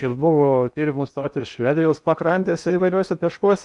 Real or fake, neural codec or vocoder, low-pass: fake; codec, 16 kHz in and 24 kHz out, 0.8 kbps, FocalCodec, streaming, 65536 codes; 10.8 kHz